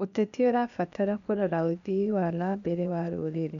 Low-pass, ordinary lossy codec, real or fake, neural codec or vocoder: 7.2 kHz; none; fake; codec, 16 kHz, 0.8 kbps, ZipCodec